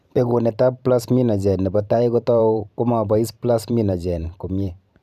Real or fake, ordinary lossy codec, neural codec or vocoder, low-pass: fake; none; vocoder, 44.1 kHz, 128 mel bands every 512 samples, BigVGAN v2; 14.4 kHz